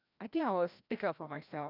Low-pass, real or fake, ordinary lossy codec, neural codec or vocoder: 5.4 kHz; fake; none; codec, 16 kHz, 1 kbps, FreqCodec, larger model